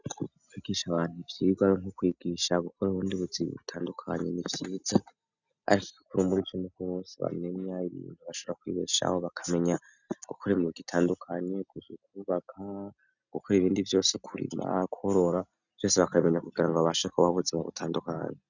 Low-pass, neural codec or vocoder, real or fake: 7.2 kHz; none; real